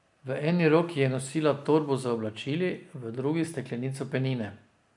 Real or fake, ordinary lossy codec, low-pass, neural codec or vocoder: real; none; 10.8 kHz; none